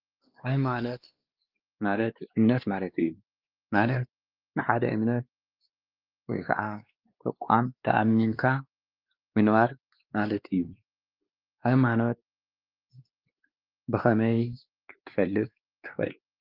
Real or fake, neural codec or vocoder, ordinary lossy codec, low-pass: fake; codec, 16 kHz, 2 kbps, X-Codec, WavLM features, trained on Multilingual LibriSpeech; Opus, 24 kbps; 5.4 kHz